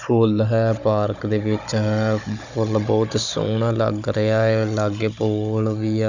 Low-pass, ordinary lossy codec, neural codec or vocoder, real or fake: 7.2 kHz; none; codec, 16 kHz, 16 kbps, FunCodec, trained on Chinese and English, 50 frames a second; fake